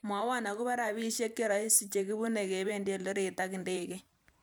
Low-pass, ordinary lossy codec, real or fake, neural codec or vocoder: none; none; fake; vocoder, 44.1 kHz, 128 mel bands every 512 samples, BigVGAN v2